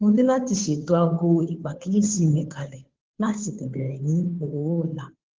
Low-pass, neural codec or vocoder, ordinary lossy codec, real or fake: 7.2 kHz; codec, 16 kHz, 2 kbps, FunCodec, trained on Chinese and English, 25 frames a second; Opus, 16 kbps; fake